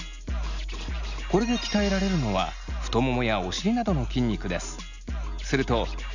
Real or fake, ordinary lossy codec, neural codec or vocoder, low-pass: real; none; none; 7.2 kHz